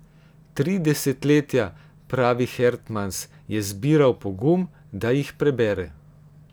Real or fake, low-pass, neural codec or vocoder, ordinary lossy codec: real; none; none; none